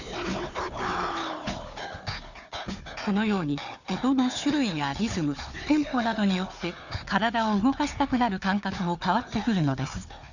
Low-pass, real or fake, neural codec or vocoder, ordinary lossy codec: 7.2 kHz; fake; codec, 16 kHz, 4 kbps, FunCodec, trained on Chinese and English, 50 frames a second; none